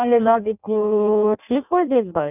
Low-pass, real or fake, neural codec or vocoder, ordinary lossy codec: 3.6 kHz; fake; codec, 16 kHz in and 24 kHz out, 0.6 kbps, FireRedTTS-2 codec; none